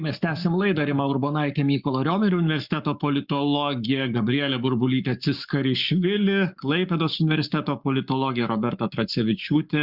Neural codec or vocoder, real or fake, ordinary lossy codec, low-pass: codec, 44.1 kHz, 7.8 kbps, Pupu-Codec; fake; Opus, 64 kbps; 5.4 kHz